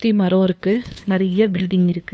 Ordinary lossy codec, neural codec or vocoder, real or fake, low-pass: none; codec, 16 kHz, 2 kbps, FunCodec, trained on LibriTTS, 25 frames a second; fake; none